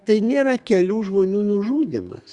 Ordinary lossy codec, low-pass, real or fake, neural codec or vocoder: Opus, 64 kbps; 10.8 kHz; fake; codec, 32 kHz, 1.9 kbps, SNAC